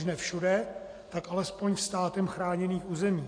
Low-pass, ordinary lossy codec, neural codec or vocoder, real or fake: 9.9 kHz; AAC, 48 kbps; none; real